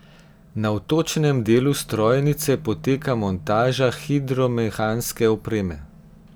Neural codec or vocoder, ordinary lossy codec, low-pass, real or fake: none; none; none; real